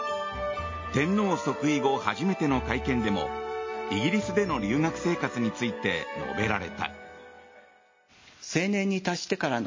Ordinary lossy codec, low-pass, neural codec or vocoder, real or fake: MP3, 32 kbps; 7.2 kHz; none; real